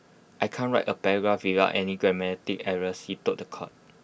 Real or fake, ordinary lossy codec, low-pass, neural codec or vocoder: real; none; none; none